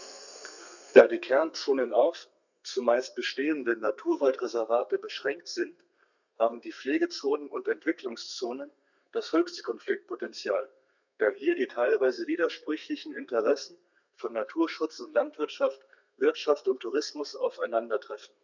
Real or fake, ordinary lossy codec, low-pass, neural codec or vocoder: fake; none; 7.2 kHz; codec, 32 kHz, 1.9 kbps, SNAC